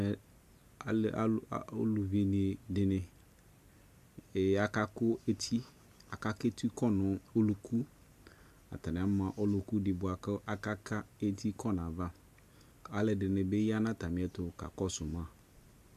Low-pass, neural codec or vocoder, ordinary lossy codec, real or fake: 14.4 kHz; none; MP3, 96 kbps; real